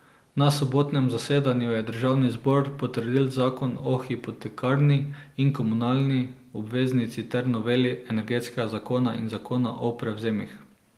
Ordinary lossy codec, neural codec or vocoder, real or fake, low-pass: Opus, 24 kbps; none; real; 14.4 kHz